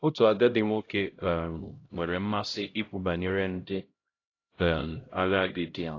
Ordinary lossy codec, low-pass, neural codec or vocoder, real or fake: AAC, 32 kbps; 7.2 kHz; codec, 16 kHz, 0.5 kbps, X-Codec, HuBERT features, trained on LibriSpeech; fake